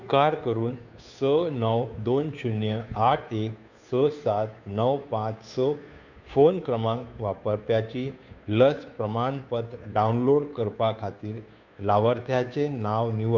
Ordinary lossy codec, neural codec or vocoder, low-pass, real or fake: none; codec, 16 kHz, 2 kbps, FunCodec, trained on Chinese and English, 25 frames a second; 7.2 kHz; fake